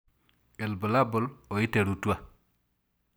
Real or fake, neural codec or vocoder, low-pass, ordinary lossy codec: real; none; none; none